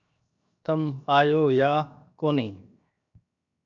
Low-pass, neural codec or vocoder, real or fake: 7.2 kHz; codec, 16 kHz, 0.7 kbps, FocalCodec; fake